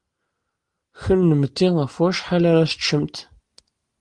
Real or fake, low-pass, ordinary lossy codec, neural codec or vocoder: real; 10.8 kHz; Opus, 24 kbps; none